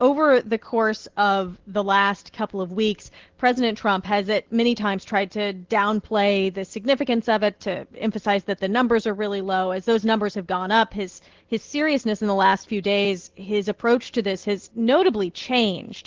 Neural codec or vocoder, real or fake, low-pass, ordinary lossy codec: none; real; 7.2 kHz; Opus, 16 kbps